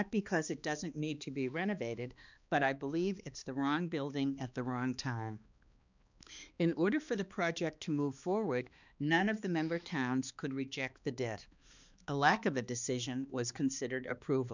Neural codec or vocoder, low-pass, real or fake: codec, 16 kHz, 2 kbps, X-Codec, HuBERT features, trained on balanced general audio; 7.2 kHz; fake